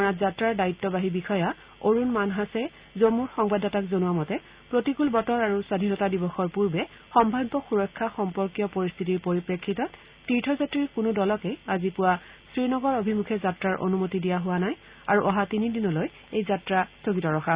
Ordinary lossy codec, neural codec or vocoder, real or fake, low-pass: Opus, 64 kbps; none; real; 3.6 kHz